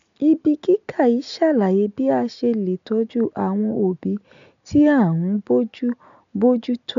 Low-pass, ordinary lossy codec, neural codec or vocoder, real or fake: 7.2 kHz; MP3, 64 kbps; none; real